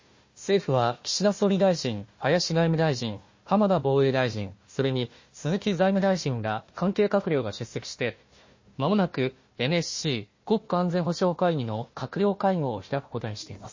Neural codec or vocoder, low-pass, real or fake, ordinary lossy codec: codec, 16 kHz, 1 kbps, FunCodec, trained on Chinese and English, 50 frames a second; 7.2 kHz; fake; MP3, 32 kbps